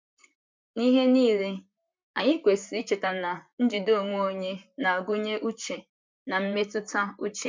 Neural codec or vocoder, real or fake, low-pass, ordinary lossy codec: vocoder, 44.1 kHz, 128 mel bands, Pupu-Vocoder; fake; 7.2 kHz; MP3, 64 kbps